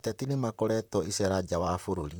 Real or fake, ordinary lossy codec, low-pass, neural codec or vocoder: fake; none; none; vocoder, 44.1 kHz, 128 mel bands, Pupu-Vocoder